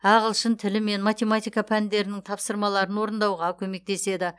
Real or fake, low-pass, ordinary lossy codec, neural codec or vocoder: real; none; none; none